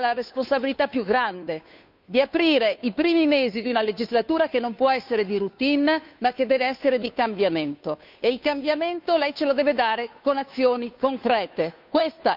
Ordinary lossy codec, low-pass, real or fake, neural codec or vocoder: none; 5.4 kHz; fake; codec, 16 kHz, 2 kbps, FunCodec, trained on Chinese and English, 25 frames a second